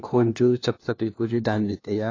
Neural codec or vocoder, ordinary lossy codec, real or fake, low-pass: codec, 16 kHz, 1 kbps, FunCodec, trained on LibriTTS, 50 frames a second; AAC, 32 kbps; fake; 7.2 kHz